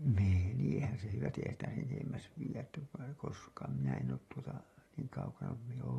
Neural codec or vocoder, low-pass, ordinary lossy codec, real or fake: none; 19.8 kHz; AAC, 32 kbps; real